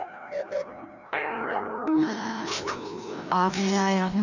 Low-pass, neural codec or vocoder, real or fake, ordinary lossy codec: 7.2 kHz; codec, 16 kHz, 1 kbps, FreqCodec, larger model; fake; none